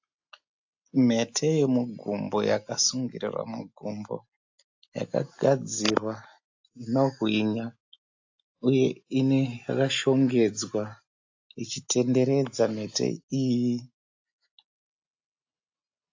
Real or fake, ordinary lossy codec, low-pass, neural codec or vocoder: real; AAC, 48 kbps; 7.2 kHz; none